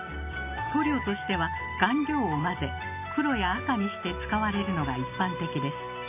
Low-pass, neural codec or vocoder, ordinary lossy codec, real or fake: 3.6 kHz; none; none; real